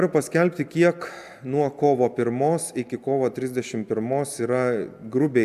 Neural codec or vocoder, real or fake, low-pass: none; real; 14.4 kHz